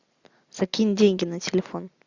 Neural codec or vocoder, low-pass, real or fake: none; 7.2 kHz; real